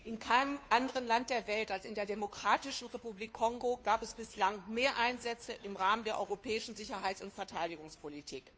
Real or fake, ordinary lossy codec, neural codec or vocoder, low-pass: fake; none; codec, 16 kHz, 2 kbps, FunCodec, trained on Chinese and English, 25 frames a second; none